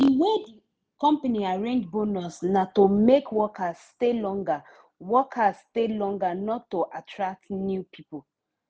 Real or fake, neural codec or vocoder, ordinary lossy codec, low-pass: real; none; none; none